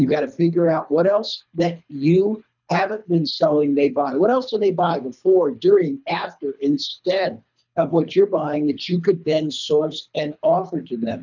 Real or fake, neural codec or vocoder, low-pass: fake; codec, 24 kHz, 3 kbps, HILCodec; 7.2 kHz